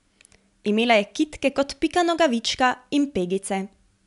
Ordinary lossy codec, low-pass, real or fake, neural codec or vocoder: none; 10.8 kHz; real; none